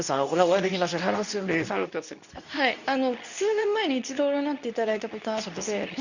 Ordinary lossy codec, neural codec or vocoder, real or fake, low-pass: none; codec, 24 kHz, 0.9 kbps, WavTokenizer, medium speech release version 2; fake; 7.2 kHz